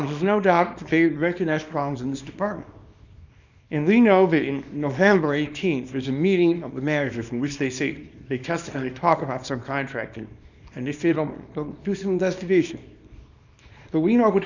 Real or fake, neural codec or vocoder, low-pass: fake; codec, 24 kHz, 0.9 kbps, WavTokenizer, small release; 7.2 kHz